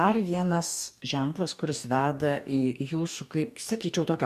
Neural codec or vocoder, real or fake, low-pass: codec, 44.1 kHz, 2.6 kbps, DAC; fake; 14.4 kHz